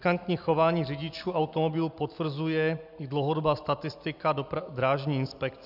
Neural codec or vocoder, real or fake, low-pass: none; real; 5.4 kHz